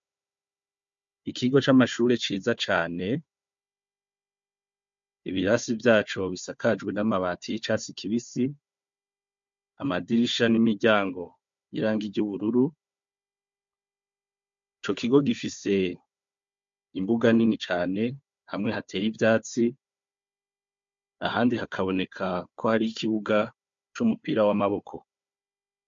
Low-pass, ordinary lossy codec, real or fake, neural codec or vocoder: 7.2 kHz; MP3, 48 kbps; fake; codec, 16 kHz, 4 kbps, FunCodec, trained on Chinese and English, 50 frames a second